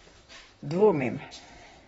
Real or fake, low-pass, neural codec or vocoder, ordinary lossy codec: fake; 19.8 kHz; vocoder, 44.1 kHz, 128 mel bands, Pupu-Vocoder; AAC, 24 kbps